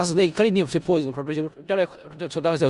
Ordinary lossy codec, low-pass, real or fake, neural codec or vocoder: Opus, 64 kbps; 10.8 kHz; fake; codec, 16 kHz in and 24 kHz out, 0.4 kbps, LongCat-Audio-Codec, four codebook decoder